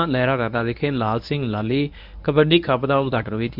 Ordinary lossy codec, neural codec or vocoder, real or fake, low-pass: none; codec, 24 kHz, 0.9 kbps, WavTokenizer, medium speech release version 1; fake; 5.4 kHz